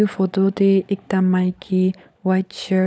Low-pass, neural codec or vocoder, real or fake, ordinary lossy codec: none; codec, 16 kHz, 16 kbps, FunCodec, trained on LibriTTS, 50 frames a second; fake; none